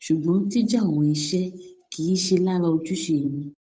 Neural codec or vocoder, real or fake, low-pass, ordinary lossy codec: codec, 16 kHz, 8 kbps, FunCodec, trained on Chinese and English, 25 frames a second; fake; none; none